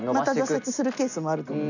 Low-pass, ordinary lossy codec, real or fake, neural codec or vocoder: 7.2 kHz; none; real; none